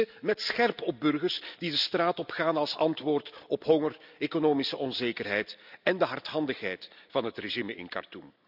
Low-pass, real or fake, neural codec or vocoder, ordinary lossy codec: 5.4 kHz; real; none; none